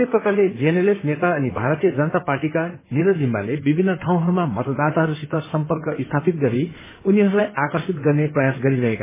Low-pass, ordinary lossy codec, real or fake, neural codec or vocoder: 3.6 kHz; MP3, 16 kbps; fake; codec, 16 kHz in and 24 kHz out, 2.2 kbps, FireRedTTS-2 codec